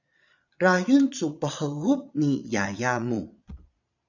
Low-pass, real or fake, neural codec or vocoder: 7.2 kHz; fake; vocoder, 22.05 kHz, 80 mel bands, Vocos